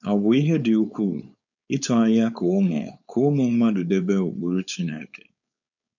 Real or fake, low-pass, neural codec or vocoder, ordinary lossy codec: fake; 7.2 kHz; codec, 16 kHz, 4.8 kbps, FACodec; none